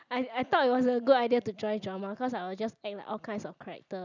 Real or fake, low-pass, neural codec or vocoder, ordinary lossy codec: real; 7.2 kHz; none; none